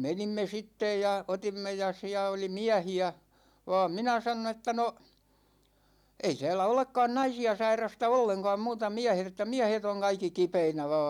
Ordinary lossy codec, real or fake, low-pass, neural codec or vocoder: none; real; 19.8 kHz; none